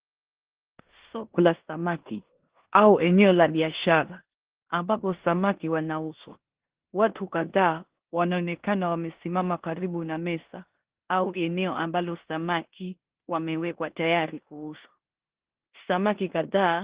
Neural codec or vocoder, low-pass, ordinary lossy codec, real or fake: codec, 16 kHz in and 24 kHz out, 0.9 kbps, LongCat-Audio-Codec, four codebook decoder; 3.6 kHz; Opus, 16 kbps; fake